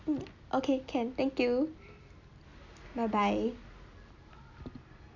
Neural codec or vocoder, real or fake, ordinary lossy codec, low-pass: none; real; none; 7.2 kHz